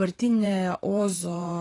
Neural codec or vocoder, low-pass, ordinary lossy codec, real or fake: vocoder, 44.1 kHz, 128 mel bands every 512 samples, BigVGAN v2; 10.8 kHz; AAC, 32 kbps; fake